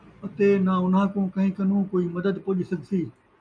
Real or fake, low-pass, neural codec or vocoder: real; 9.9 kHz; none